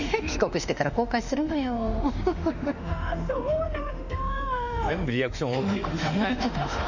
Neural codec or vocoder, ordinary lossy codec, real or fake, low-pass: autoencoder, 48 kHz, 32 numbers a frame, DAC-VAE, trained on Japanese speech; none; fake; 7.2 kHz